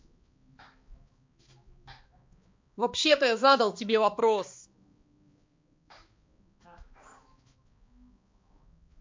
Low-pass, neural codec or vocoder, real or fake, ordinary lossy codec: 7.2 kHz; codec, 16 kHz, 2 kbps, X-Codec, HuBERT features, trained on balanced general audio; fake; MP3, 48 kbps